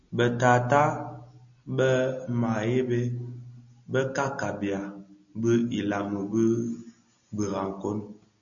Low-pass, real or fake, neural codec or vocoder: 7.2 kHz; real; none